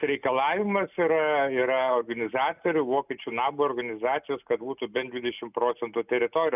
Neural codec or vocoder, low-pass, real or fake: none; 3.6 kHz; real